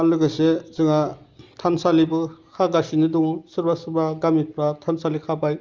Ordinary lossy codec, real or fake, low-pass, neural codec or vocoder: Opus, 32 kbps; real; 7.2 kHz; none